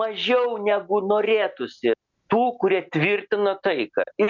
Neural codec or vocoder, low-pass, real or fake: none; 7.2 kHz; real